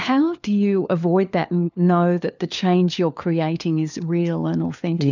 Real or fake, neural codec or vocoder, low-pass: fake; codec, 16 kHz, 4 kbps, FunCodec, trained on LibriTTS, 50 frames a second; 7.2 kHz